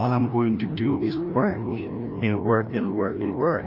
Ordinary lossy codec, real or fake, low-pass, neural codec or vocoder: AAC, 32 kbps; fake; 5.4 kHz; codec, 16 kHz, 1 kbps, FreqCodec, larger model